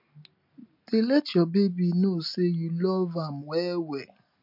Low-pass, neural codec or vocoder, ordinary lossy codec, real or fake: 5.4 kHz; none; MP3, 48 kbps; real